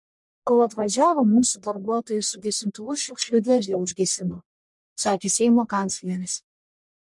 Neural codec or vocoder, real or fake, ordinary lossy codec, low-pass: codec, 44.1 kHz, 1.7 kbps, Pupu-Codec; fake; MP3, 64 kbps; 10.8 kHz